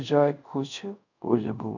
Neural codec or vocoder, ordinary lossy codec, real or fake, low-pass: codec, 24 kHz, 0.5 kbps, DualCodec; none; fake; 7.2 kHz